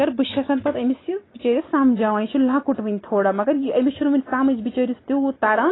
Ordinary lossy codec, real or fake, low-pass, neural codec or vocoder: AAC, 16 kbps; real; 7.2 kHz; none